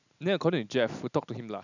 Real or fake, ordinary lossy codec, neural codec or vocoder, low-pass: real; none; none; 7.2 kHz